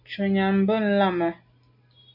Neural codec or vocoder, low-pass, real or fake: none; 5.4 kHz; real